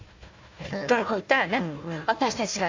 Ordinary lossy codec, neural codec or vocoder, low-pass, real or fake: MP3, 48 kbps; codec, 16 kHz, 1 kbps, FunCodec, trained on Chinese and English, 50 frames a second; 7.2 kHz; fake